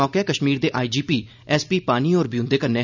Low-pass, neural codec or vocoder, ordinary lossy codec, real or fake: 7.2 kHz; none; none; real